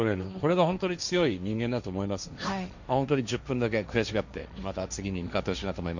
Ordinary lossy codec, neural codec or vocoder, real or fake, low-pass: none; codec, 16 kHz, 1.1 kbps, Voila-Tokenizer; fake; 7.2 kHz